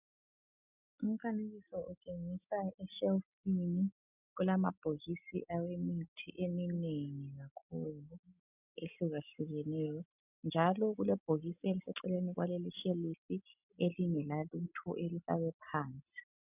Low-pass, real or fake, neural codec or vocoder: 3.6 kHz; real; none